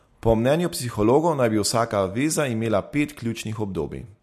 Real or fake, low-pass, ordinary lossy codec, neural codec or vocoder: real; 14.4 kHz; MP3, 64 kbps; none